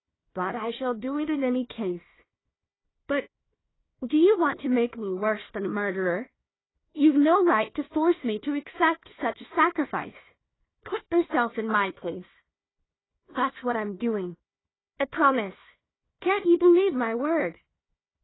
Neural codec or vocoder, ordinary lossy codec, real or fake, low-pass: codec, 16 kHz, 1 kbps, FunCodec, trained on Chinese and English, 50 frames a second; AAC, 16 kbps; fake; 7.2 kHz